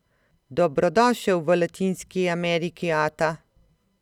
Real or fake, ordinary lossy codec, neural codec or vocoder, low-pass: real; none; none; 19.8 kHz